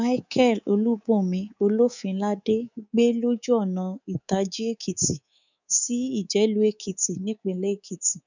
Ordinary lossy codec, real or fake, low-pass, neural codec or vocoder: none; fake; 7.2 kHz; codec, 24 kHz, 3.1 kbps, DualCodec